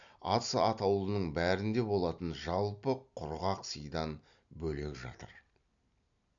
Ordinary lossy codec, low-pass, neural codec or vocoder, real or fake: none; 7.2 kHz; none; real